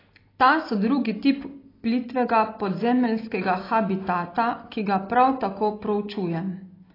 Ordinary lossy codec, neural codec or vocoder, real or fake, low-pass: AAC, 24 kbps; none; real; 5.4 kHz